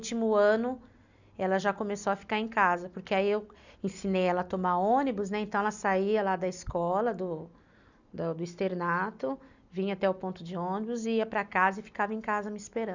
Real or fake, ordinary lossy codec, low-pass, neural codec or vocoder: real; none; 7.2 kHz; none